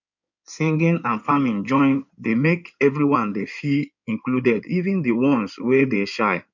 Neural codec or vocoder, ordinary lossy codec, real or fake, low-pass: codec, 16 kHz in and 24 kHz out, 2.2 kbps, FireRedTTS-2 codec; none; fake; 7.2 kHz